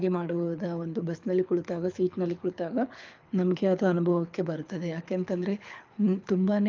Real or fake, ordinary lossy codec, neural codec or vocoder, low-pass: fake; Opus, 24 kbps; codec, 24 kHz, 6 kbps, HILCodec; 7.2 kHz